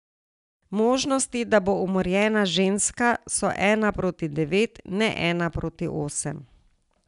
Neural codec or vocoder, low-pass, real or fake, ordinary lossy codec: none; 10.8 kHz; real; none